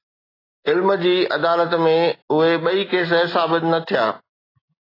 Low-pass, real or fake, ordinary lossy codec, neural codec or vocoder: 5.4 kHz; real; AAC, 24 kbps; none